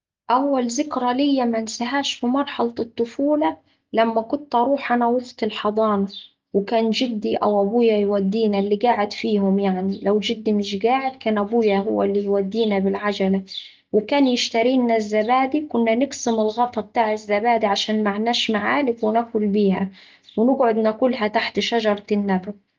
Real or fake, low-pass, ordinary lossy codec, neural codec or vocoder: real; 7.2 kHz; Opus, 32 kbps; none